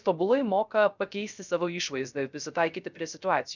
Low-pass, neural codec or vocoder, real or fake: 7.2 kHz; codec, 16 kHz, 0.3 kbps, FocalCodec; fake